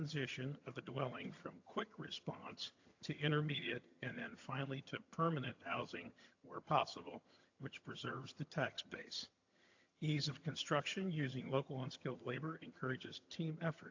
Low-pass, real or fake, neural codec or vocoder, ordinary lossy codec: 7.2 kHz; fake; vocoder, 22.05 kHz, 80 mel bands, HiFi-GAN; AAC, 48 kbps